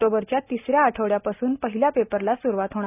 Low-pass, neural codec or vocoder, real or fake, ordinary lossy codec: 3.6 kHz; none; real; none